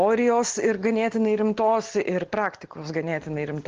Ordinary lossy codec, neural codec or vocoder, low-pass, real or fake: Opus, 16 kbps; none; 7.2 kHz; real